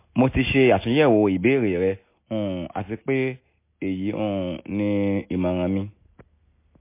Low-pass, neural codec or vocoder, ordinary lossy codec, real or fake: 3.6 kHz; none; MP3, 24 kbps; real